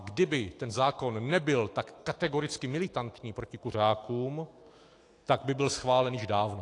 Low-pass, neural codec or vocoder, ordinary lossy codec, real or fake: 10.8 kHz; autoencoder, 48 kHz, 128 numbers a frame, DAC-VAE, trained on Japanese speech; AAC, 48 kbps; fake